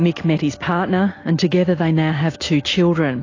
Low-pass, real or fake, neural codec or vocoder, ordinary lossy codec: 7.2 kHz; real; none; AAC, 32 kbps